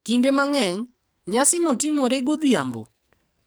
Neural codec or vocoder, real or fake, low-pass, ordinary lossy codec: codec, 44.1 kHz, 2.6 kbps, SNAC; fake; none; none